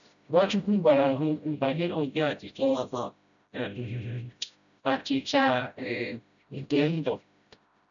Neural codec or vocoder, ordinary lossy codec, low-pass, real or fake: codec, 16 kHz, 0.5 kbps, FreqCodec, smaller model; none; 7.2 kHz; fake